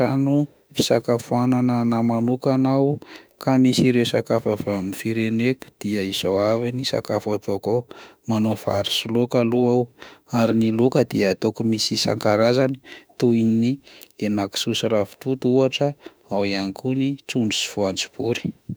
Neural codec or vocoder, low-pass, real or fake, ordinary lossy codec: autoencoder, 48 kHz, 32 numbers a frame, DAC-VAE, trained on Japanese speech; none; fake; none